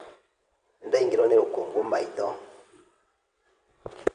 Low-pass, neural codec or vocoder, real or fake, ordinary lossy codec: 9.9 kHz; vocoder, 22.05 kHz, 80 mel bands, WaveNeXt; fake; none